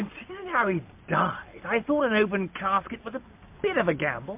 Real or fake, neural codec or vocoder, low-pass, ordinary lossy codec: real; none; 3.6 kHz; MP3, 32 kbps